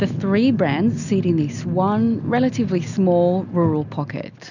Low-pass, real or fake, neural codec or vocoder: 7.2 kHz; real; none